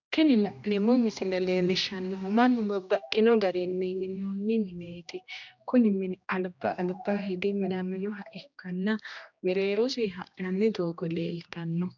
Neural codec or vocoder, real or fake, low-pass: codec, 16 kHz, 1 kbps, X-Codec, HuBERT features, trained on general audio; fake; 7.2 kHz